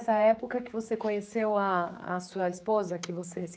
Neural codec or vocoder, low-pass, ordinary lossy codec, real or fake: codec, 16 kHz, 4 kbps, X-Codec, HuBERT features, trained on general audio; none; none; fake